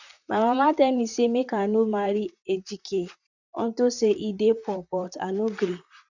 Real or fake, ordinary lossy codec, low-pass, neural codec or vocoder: fake; none; 7.2 kHz; vocoder, 44.1 kHz, 128 mel bands, Pupu-Vocoder